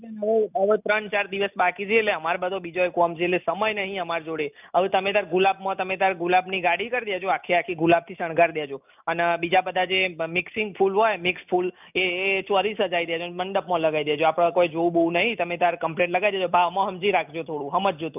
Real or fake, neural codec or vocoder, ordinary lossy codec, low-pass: real; none; none; 3.6 kHz